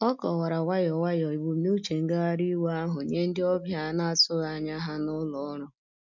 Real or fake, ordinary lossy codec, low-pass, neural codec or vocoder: real; none; 7.2 kHz; none